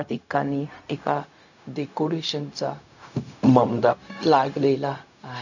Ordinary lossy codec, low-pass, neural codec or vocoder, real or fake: none; 7.2 kHz; codec, 16 kHz, 0.4 kbps, LongCat-Audio-Codec; fake